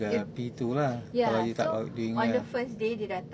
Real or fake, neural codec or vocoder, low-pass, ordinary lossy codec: real; none; none; none